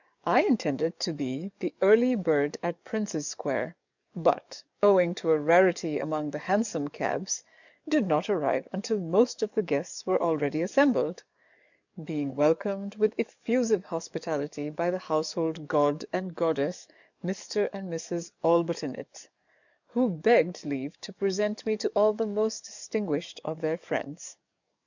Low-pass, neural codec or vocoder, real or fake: 7.2 kHz; codec, 44.1 kHz, 7.8 kbps, DAC; fake